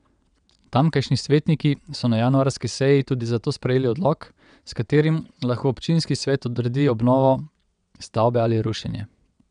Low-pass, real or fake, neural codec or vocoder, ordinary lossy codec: 9.9 kHz; fake; vocoder, 22.05 kHz, 80 mel bands, WaveNeXt; none